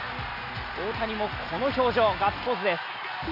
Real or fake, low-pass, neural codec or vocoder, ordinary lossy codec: real; 5.4 kHz; none; none